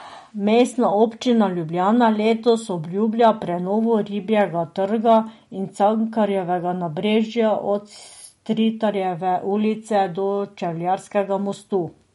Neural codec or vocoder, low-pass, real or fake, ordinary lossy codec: none; 19.8 kHz; real; MP3, 48 kbps